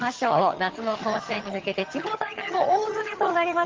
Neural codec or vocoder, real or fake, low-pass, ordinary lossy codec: vocoder, 22.05 kHz, 80 mel bands, HiFi-GAN; fake; 7.2 kHz; Opus, 16 kbps